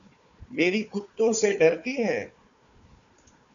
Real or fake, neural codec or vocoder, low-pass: fake; codec, 16 kHz, 4 kbps, FunCodec, trained on Chinese and English, 50 frames a second; 7.2 kHz